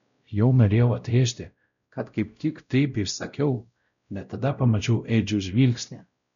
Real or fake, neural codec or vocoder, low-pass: fake; codec, 16 kHz, 0.5 kbps, X-Codec, WavLM features, trained on Multilingual LibriSpeech; 7.2 kHz